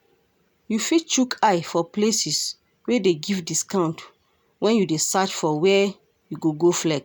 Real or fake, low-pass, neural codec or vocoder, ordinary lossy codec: real; none; none; none